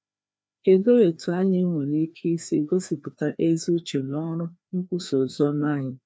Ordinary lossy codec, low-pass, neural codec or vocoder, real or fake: none; none; codec, 16 kHz, 2 kbps, FreqCodec, larger model; fake